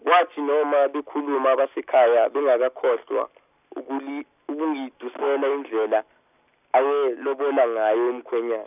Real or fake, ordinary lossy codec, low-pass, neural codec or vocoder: real; none; 3.6 kHz; none